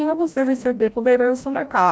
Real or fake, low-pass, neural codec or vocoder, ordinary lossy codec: fake; none; codec, 16 kHz, 0.5 kbps, FreqCodec, larger model; none